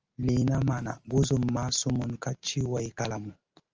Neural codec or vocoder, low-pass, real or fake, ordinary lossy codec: none; 7.2 kHz; real; Opus, 24 kbps